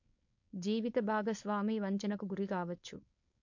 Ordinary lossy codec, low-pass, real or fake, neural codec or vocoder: MP3, 48 kbps; 7.2 kHz; fake; codec, 16 kHz, 4.8 kbps, FACodec